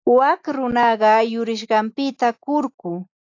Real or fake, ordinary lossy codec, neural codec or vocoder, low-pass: real; AAC, 48 kbps; none; 7.2 kHz